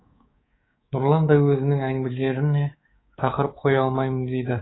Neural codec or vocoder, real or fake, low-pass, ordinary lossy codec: codec, 24 kHz, 3.1 kbps, DualCodec; fake; 7.2 kHz; AAC, 16 kbps